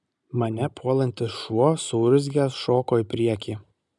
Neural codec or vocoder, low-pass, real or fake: none; 10.8 kHz; real